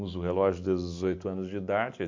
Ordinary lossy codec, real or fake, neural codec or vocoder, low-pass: MP3, 48 kbps; real; none; 7.2 kHz